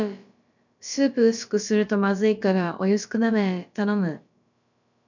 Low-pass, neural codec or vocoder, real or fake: 7.2 kHz; codec, 16 kHz, about 1 kbps, DyCAST, with the encoder's durations; fake